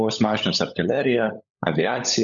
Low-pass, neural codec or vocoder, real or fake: 7.2 kHz; codec, 16 kHz, 16 kbps, FunCodec, trained on LibriTTS, 50 frames a second; fake